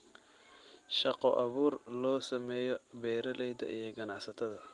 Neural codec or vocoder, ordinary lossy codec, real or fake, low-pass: none; Opus, 24 kbps; real; 10.8 kHz